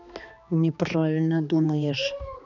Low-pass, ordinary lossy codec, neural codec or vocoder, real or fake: 7.2 kHz; none; codec, 16 kHz, 2 kbps, X-Codec, HuBERT features, trained on balanced general audio; fake